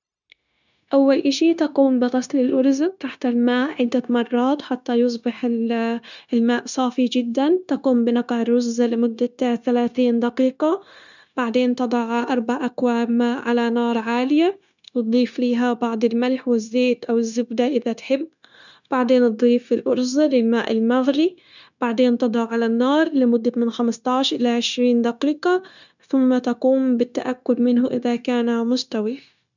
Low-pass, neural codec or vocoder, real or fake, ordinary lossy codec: 7.2 kHz; codec, 16 kHz, 0.9 kbps, LongCat-Audio-Codec; fake; none